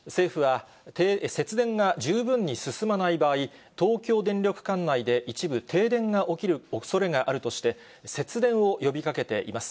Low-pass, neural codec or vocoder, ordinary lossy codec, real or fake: none; none; none; real